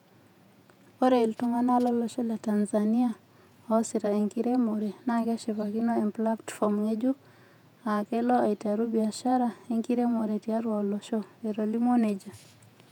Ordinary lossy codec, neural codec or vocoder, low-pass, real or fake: none; vocoder, 48 kHz, 128 mel bands, Vocos; 19.8 kHz; fake